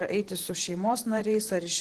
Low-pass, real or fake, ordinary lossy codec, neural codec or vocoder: 14.4 kHz; fake; Opus, 16 kbps; vocoder, 44.1 kHz, 128 mel bands, Pupu-Vocoder